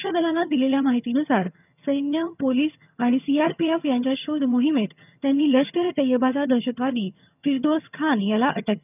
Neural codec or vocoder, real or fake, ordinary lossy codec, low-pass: vocoder, 22.05 kHz, 80 mel bands, HiFi-GAN; fake; none; 3.6 kHz